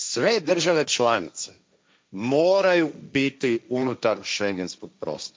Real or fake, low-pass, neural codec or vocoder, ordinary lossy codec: fake; none; codec, 16 kHz, 1.1 kbps, Voila-Tokenizer; none